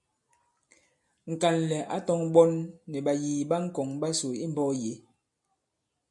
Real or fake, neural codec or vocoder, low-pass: real; none; 10.8 kHz